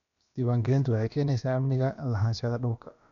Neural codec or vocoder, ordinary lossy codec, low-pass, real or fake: codec, 16 kHz, about 1 kbps, DyCAST, with the encoder's durations; none; 7.2 kHz; fake